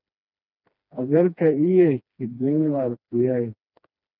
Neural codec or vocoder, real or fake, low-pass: codec, 16 kHz, 2 kbps, FreqCodec, smaller model; fake; 5.4 kHz